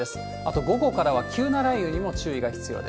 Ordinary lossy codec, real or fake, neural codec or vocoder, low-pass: none; real; none; none